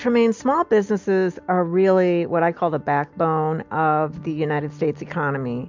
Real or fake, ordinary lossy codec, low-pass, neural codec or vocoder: real; MP3, 64 kbps; 7.2 kHz; none